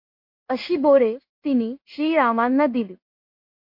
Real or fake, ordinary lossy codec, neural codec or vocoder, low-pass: fake; MP3, 32 kbps; codec, 16 kHz in and 24 kHz out, 1 kbps, XY-Tokenizer; 5.4 kHz